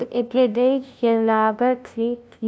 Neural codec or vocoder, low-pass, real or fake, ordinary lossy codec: codec, 16 kHz, 0.5 kbps, FunCodec, trained on LibriTTS, 25 frames a second; none; fake; none